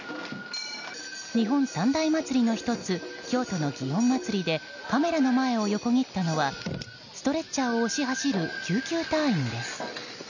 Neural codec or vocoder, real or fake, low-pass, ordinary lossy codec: none; real; 7.2 kHz; none